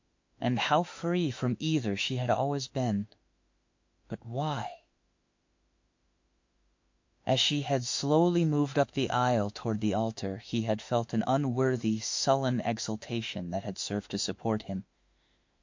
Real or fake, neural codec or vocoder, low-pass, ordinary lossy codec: fake; autoencoder, 48 kHz, 32 numbers a frame, DAC-VAE, trained on Japanese speech; 7.2 kHz; MP3, 48 kbps